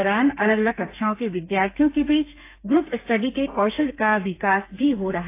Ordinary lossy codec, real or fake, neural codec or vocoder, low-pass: AAC, 24 kbps; fake; codec, 32 kHz, 1.9 kbps, SNAC; 3.6 kHz